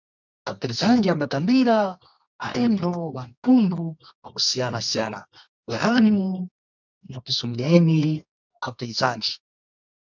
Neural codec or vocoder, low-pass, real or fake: codec, 24 kHz, 0.9 kbps, WavTokenizer, medium music audio release; 7.2 kHz; fake